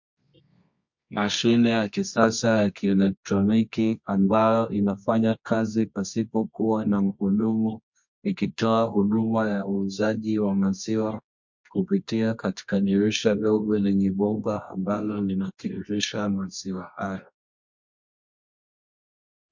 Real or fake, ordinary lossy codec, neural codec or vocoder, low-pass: fake; MP3, 48 kbps; codec, 24 kHz, 0.9 kbps, WavTokenizer, medium music audio release; 7.2 kHz